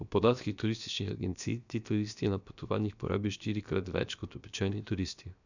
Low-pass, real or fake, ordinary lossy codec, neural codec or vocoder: 7.2 kHz; fake; none; codec, 16 kHz, 0.7 kbps, FocalCodec